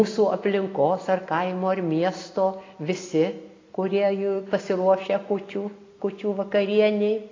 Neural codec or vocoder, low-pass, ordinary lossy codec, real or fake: none; 7.2 kHz; AAC, 32 kbps; real